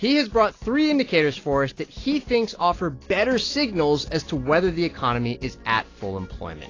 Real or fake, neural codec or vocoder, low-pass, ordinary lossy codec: real; none; 7.2 kHz; AAC, 32 kbps